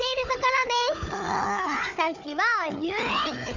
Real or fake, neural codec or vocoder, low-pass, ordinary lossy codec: fake; codec, 16 kHz, 4 kbps, FunCodec, trained on Chinese and English, 50 frames a second; 7.2 kHz; none